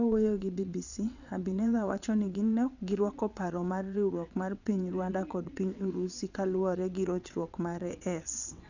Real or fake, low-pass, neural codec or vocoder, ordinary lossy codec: fake; 7.2 kHz; vocoder, 22.05 kHz, 80 mel bands, WaveNeXt; none